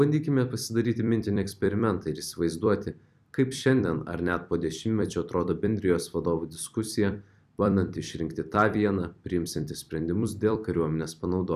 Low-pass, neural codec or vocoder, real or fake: 14.4 kHz; vocoder, 44.1 kHz, 128 mel bands every 256 samples, BigVGAN v2; fake